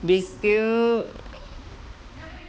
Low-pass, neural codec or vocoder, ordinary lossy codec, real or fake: none; codec, 16 kHz, 2 kbps, X-Codec, HuBERT features, trained on balanced general audio; none; fake